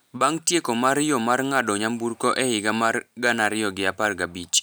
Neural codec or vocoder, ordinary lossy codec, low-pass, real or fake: none; none; none; real